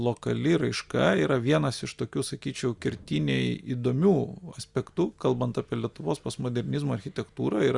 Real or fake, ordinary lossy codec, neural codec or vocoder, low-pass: real; Opus, 64 kbps; none; 10.8 kHz